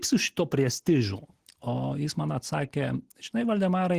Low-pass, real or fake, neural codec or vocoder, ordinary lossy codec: 14.4 kHz; real; none; Opus, 16 kbps